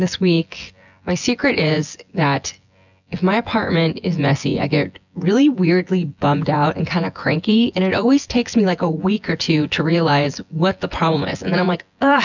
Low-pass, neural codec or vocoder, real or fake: 7.2 kHz; vocoder, 24 kHz, 100 mel bands, Vocos; fake